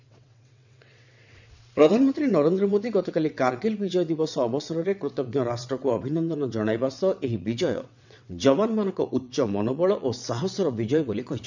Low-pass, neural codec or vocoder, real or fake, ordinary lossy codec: 7.2 kHz; vocoder, 22.05 kHz, 80 mel bands, WaveNeXt; fake; none